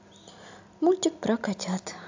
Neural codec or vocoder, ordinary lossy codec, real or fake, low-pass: none; AAC, 48 kbps; real; 7.2 kHz